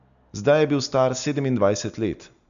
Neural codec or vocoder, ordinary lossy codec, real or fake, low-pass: none; none; real; 7.2 kHz